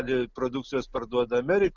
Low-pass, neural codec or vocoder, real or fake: 7.2 kHz; none; real